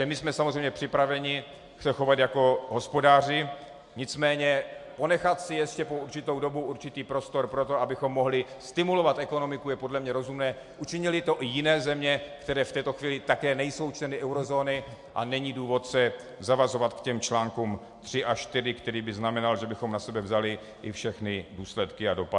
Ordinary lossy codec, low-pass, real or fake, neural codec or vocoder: MP3, 64 kbps; 10.8 kHz; real; none